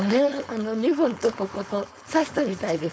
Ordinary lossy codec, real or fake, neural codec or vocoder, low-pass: none; fake; codec, 16 kHz, 4.8 kbps, FACodec; none